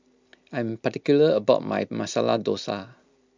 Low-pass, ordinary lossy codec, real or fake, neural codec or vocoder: 7.2 kHz; MP3, 64 kbps; real; none